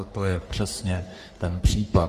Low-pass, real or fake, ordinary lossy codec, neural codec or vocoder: 14.4 kHz; fake; Opus, 32 kbps; codec, 44.1 kHz, 3.4 kbps, Pupu-Codec